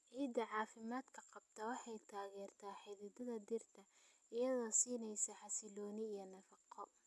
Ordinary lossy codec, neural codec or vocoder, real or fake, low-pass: none; none; real; none